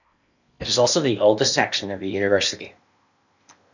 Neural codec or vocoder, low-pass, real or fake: codec, 16 kHz in and 24 kHz out, 0.8 kbps, FocalCodec, streaming, 65536 codes; 7.2 kHz; fake